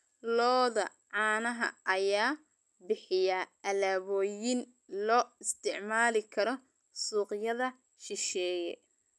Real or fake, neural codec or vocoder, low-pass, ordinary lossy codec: real; none; none; none